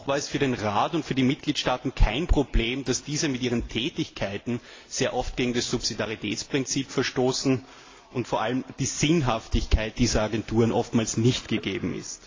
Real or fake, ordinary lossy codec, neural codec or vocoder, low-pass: real; AAC, 32 kbps; none; 7.2 kHz